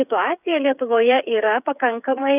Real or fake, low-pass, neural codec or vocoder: fake; 3.6 kHz; codec, 16 kHz, 8 kbps, FreqCodec, smaller model